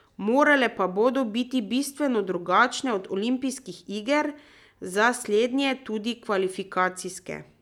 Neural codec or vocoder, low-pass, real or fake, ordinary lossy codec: none; 19.8 kHz; real; none